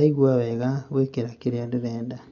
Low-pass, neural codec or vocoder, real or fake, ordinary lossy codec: 7.2 kHz; none; real; none